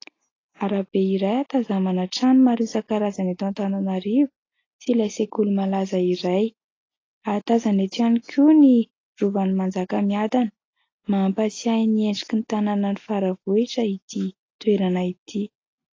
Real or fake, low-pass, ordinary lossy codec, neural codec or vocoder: real; 7.2 kHz; AAC, 32 kbps; none